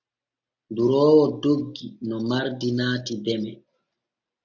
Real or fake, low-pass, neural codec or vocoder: real; 7.2 kHz; none